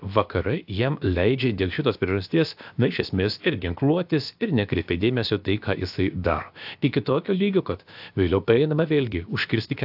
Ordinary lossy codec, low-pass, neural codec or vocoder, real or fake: AAC, 48 kbps; 5.4 kHz; codec, 16 kHz, about 1 kbps, DyCAST, with the encoder's durations; fake